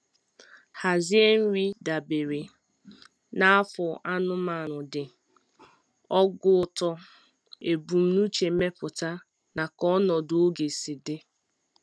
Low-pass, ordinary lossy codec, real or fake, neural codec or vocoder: none; none; real; none